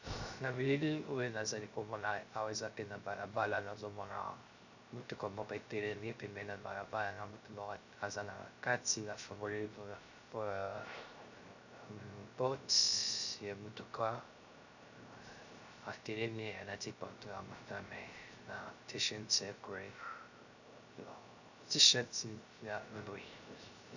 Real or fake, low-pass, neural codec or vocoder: fake; 7.2 kHz; codec, 16 kHz, 0.3 kbps, FocalCodec